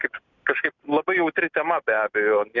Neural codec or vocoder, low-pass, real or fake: none; 7.2 kHz; real